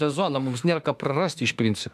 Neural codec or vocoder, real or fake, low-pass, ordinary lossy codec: autoencoder, 48 kHz, 32 numbers a frame, DAC-VAE, trained on Japanese speech; fake; 14.4 kHz; MP3, 96 kbps